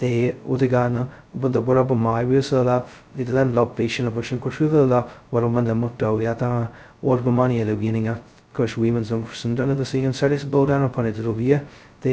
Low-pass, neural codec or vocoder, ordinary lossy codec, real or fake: none; codec, 16 kHz, 0.2 kbps, FocalCodec; none; fake